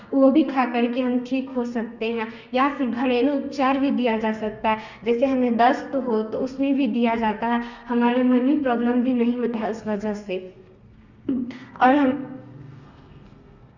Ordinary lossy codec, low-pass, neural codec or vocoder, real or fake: Opus, 64 kbps; 7.2 kHz; codec, 32 kHz, 1.9 kbps, SNAC; fake